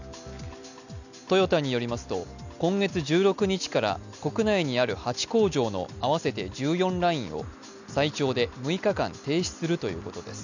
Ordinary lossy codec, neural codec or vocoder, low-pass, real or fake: none; none; 7.2 kHz; real